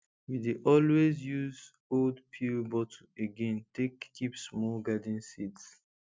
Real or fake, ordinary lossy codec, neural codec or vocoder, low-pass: real; none; none; none